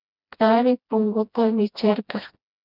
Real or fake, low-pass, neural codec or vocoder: fake; 5.4 kHz; codec, 16 kHz, 1 kbps, FreqCodec, smaller model